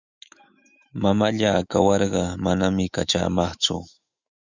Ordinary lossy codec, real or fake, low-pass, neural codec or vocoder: Opus, 64 kbps; fake; 7.2 kHz; autoencoder, 48 kHz, 128 numbers a frame, DAC-VAE, trained on Japanese speech